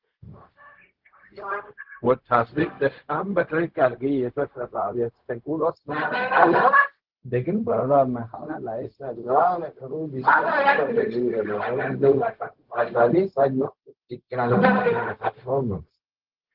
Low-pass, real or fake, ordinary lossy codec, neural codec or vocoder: 5.4 kHz; fake; Opus, 16 kbps; codec, 16 kHz, 0.4 kbps, LongCat-Audio-Codec